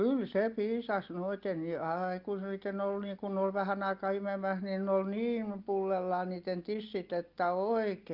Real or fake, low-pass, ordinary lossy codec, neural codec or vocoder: real; 5.4 kHz; Opus, 32 kbps; none